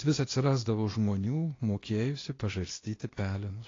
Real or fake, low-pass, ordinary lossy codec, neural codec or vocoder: fake; 7.2 kHz; AAC, 32 kbps; codec, 16 kHz, 0.8 kbps, ZipCodec